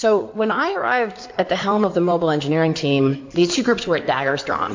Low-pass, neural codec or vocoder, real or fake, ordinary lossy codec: 7.2 kHz; codec, 16 kHz in and 24 kHz out, 2.2 kbps, FireRedTTS-2 codec; fake; MP3, 48 kbps